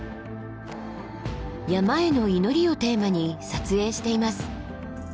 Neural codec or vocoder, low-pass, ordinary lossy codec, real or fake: none; none; none; real